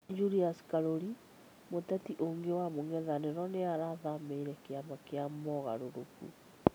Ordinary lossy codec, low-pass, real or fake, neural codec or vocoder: none; none; real; none